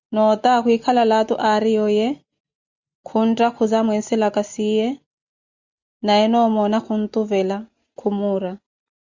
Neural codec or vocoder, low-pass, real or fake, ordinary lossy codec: none; 7.2 kHz; real; Opus, 64 kbps